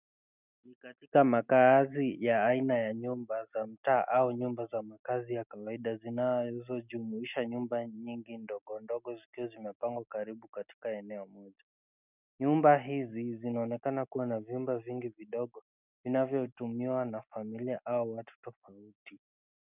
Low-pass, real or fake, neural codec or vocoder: 3.6 kHz; real; none